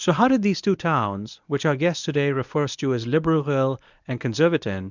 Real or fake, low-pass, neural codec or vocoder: fake; 7.2 kHz; codec, 24 kHz, 0.9 kbps, WavTokenizer, medium speech release version 1